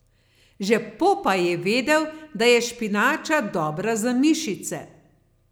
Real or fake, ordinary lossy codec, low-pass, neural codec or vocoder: real; none; none; none